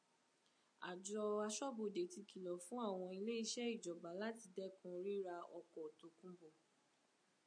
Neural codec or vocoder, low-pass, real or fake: none; 9.9 kHz; real